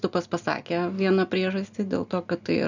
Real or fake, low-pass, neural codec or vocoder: real; 7.2 kHz; none